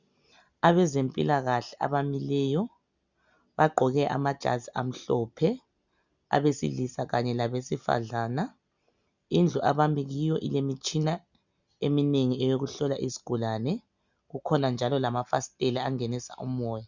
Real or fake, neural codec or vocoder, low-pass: real; none; 7.2 kHz